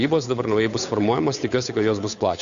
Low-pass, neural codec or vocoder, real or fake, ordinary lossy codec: 7.2 kHz; codec, 16 kHz, 8 kbps, FunCodec, trained on Chinese and English, 25 frames a second; fake; AAC, 96 kbps